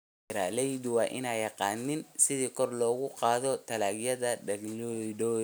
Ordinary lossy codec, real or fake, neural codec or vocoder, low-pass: none; real; none; none